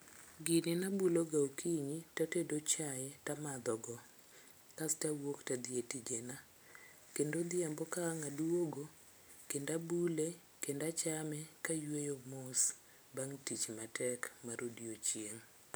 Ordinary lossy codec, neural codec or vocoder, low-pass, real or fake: none; none; none; real